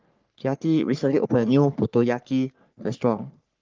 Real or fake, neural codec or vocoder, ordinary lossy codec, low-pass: fake; codec, 44.1 kHz, 3.4 kbps, Pupu-Codec; Opus, 32 kbps; 7.2 kHz